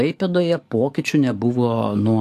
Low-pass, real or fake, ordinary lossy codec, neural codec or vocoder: 14.4 kHz; fake; AAC, 64 kbps; codec, 44.1 kHz, 7.8 kbps, DAC